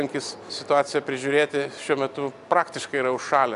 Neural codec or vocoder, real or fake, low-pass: none; real; 10.8 kHz